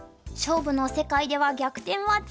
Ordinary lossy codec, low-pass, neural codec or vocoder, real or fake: none; none; none; real